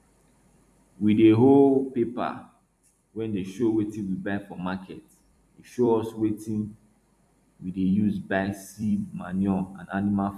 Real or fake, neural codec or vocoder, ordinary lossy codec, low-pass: fake; vocoder, 44.1 kHz, 128 mel bands every 256 samples, BigVGAN v2; none; 14.4 kHz